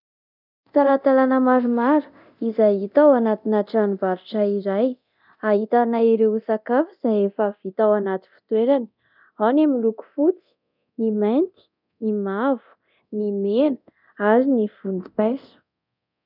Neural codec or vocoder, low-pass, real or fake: codec, 24 kHz, 0.9 kbps, DualCodec; 5.4 kHz; fake